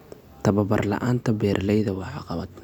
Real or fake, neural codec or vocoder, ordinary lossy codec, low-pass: real; none; none; 19.8 kHz